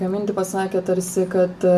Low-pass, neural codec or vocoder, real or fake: 14.4 kHz; none; real